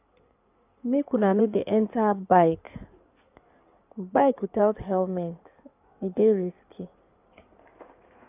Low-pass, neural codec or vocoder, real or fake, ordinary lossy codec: 3.6 kHz; codec, 16 kHz in and 24 kHz out, 2.2 kbps, FireRedTTS-2 codec; fake; AAC, 32 kbps